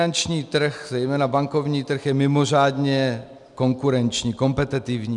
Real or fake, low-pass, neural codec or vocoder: real; 10.8 kHz; none